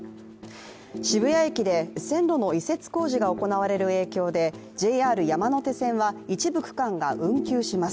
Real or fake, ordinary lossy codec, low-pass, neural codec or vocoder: real; none; none; none